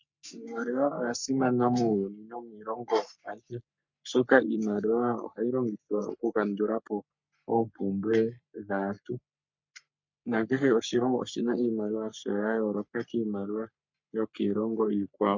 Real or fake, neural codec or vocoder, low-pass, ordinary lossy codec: fake; codec, 44.1 kHz, 3.4 kbps, Pupu-Codec; 7.2 kHz; MP3, 48 kbps